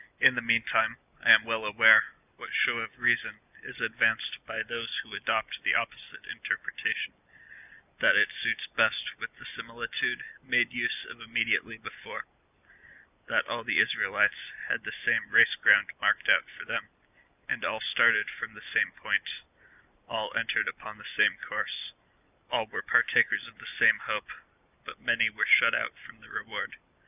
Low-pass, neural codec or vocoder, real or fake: 3.6 kHz; none; real